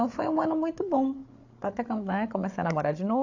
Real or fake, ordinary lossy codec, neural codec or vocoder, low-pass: fake; AAC, 48 kbps; codec, 16 kHz, 8 kbps, FreqCodec, larger model; 7.2 kHz